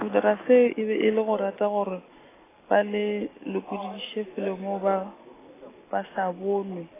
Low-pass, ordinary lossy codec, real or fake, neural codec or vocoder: 3.6 kHz; AAC, 16 kbps; real; none